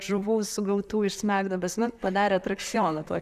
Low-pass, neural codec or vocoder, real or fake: 14.4 kHz; vocoder, 44.1 kHz, 128 mel bands, Pupu-Vocoder; fake